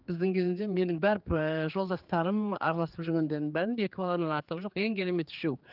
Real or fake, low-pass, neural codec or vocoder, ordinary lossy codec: fake; 5.4 kHz; codec, 16 kHz, 4 kbps, X-Codec, HuBERT features, trained on general audio; Opus, 32 kbps